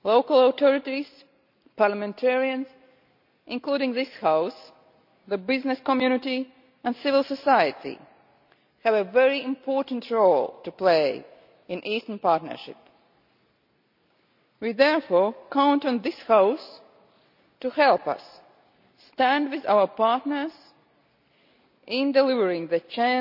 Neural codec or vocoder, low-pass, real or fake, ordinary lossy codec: none; 5.4 kHz; real; none